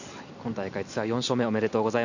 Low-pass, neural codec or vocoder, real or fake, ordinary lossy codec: 7.2 kHz; none; real; none